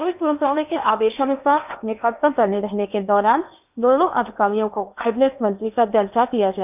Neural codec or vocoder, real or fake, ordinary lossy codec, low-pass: codec, 16 kHz in and 24 kHz out, 0.8 kbps, FocalCodec, streaming, 65536 codes; fake; none; 3.6 kHz